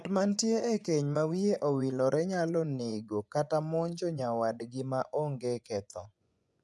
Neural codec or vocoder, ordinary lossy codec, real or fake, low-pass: vocoder, 24 kHz, 100 mel bands, Vocos; none; fake; none